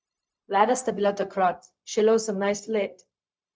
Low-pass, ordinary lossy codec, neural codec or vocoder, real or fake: none; none; codec, 16 kHz, 0.4 kbps, LongCat-Audio-Codec; fake